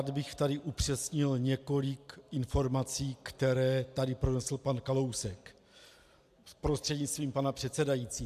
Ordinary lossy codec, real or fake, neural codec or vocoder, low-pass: AAC, 96 kbps; real; none; 14.4 kHz